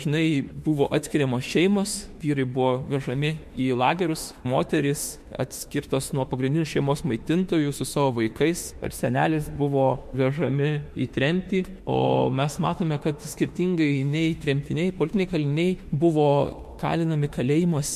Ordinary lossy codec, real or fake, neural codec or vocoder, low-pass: MP3, 64 kbps; fake; autoencoder, 48 kHz, 32 numbers a frame, DAC-VAE, trained on Japanese speech; 14.4 kHz